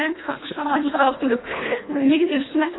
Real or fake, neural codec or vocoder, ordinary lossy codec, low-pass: fake; codec, 24 kHz, 1.5 kbps, HILCodec; AAC, 16 kbps; 7.2 kHz